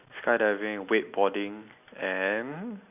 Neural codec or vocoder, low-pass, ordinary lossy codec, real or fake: none; 3.6 kHz; none; real